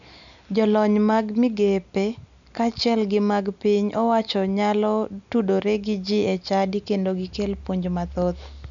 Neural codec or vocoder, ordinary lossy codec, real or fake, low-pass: none; none; real; 7.2 kHz